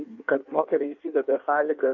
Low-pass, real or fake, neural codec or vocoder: 7.2 kHz; fake; codec, 16 kHz in and 24 kHz out, 1.1 kbps, FireRedTTS-2 codec